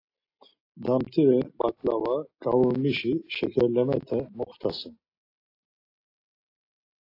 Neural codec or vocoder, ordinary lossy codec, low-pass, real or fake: none; AAC, 32 kbps; 5.4 kHz; real